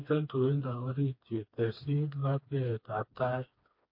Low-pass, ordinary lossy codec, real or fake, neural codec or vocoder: 5.4 kHz; MP3, 32 kbps; fake; codec, 16 kHz, 2 kbps, FreqCodec, smaller model